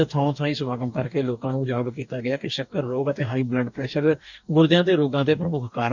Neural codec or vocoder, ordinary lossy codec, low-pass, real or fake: codec, 44.1 kHz, 2.6 kbps, DAC; none; 7.2 kHz; fake